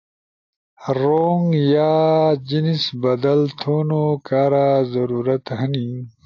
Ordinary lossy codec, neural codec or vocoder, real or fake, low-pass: AAC, 48 kbps; none; real; 7.2 kHz